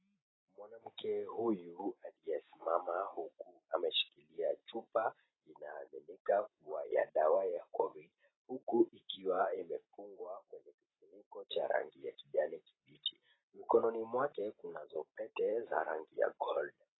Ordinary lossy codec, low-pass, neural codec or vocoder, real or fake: AAC, 16 kbps; 7.2 kHz; none; real